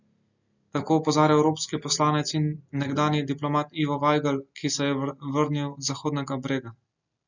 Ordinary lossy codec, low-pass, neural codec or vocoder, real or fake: none; 7.2 kHz; none; real